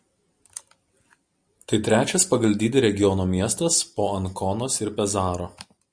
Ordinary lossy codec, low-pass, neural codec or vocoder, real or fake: Opus, 64 kbps; 9.9 kHz; none; real